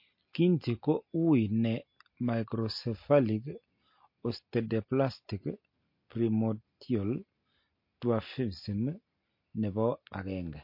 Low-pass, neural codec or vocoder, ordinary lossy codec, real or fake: 5.4 kHz; none; MP3, 32 kbps; real